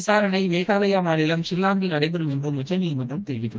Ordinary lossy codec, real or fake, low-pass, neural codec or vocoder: none; fake; none; codec, 16 kHz, 1 kbps, FreqCodec, smaller model